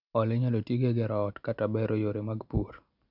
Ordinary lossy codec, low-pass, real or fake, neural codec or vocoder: none; 5.4 kHz; real; none